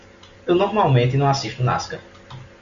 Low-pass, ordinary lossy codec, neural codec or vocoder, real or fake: 7.2 kHz; Opus, 64 kbps; none; real